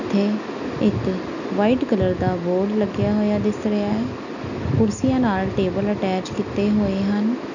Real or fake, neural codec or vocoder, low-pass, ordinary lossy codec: real; none; 7.2 kHz; none